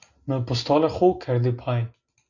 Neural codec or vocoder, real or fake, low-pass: none; real; 7.2 kHz